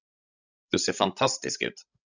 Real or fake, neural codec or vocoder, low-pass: fake; codec, 16 kHz, 16 kbps, FreqCodec, larger model; 7.2 kHz